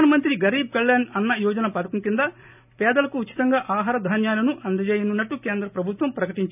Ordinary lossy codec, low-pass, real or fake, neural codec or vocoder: none; 3.6 kHz; real; none